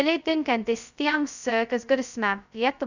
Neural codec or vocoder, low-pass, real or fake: codec, 16 kHz, 0.2 kbps, FocalCodec; 7.2 kHz; fake